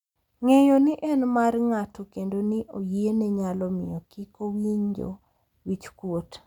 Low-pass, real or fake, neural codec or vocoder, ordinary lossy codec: 19.8 kHz; real; none; none